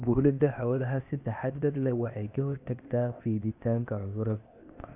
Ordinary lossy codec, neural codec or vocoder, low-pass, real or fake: AAC, 32 kbps; codec, 16 kHz, 0.8 kbps, ZipCodec; 3.6 kHz; fake